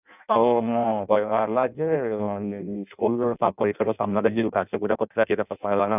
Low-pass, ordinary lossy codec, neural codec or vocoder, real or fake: 3.6 kHz; none; codec, 16 kHz in and 24 kHz out, 0.6 kbps, FireRedTTS-2 codec; fake